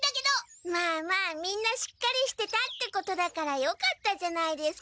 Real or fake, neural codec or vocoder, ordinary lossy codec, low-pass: real; none; none; none